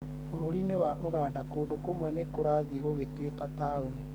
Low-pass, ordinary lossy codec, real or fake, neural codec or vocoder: none; none; fake; codec, 44.1 kHz, 2.6 kbps, SNAC